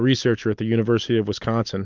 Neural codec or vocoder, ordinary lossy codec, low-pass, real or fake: none; Opus, 24 kbps; 7.2 kHz; real